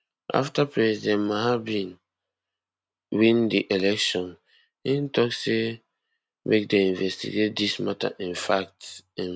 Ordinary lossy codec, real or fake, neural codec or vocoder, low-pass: none; real; none; none